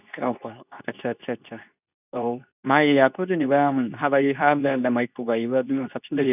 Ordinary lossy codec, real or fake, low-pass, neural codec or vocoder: none; fake; 3.6 kHz; codec, 24 kHz, 0.9 kbps, WavTokenizer, medium speech release version 2